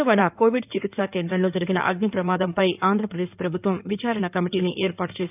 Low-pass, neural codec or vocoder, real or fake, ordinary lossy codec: 3.6 kHz; codec, 16 kHz in and 24 kHz out, 2.2 kbps, FireRedTTS-2 codec; fake; none